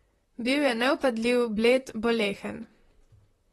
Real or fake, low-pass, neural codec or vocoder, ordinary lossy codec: fake; 19.8 kHz; vocoder, 44.1 kHz, 128 mel bands, Pupu-Vocoder; AAC, 32 kbps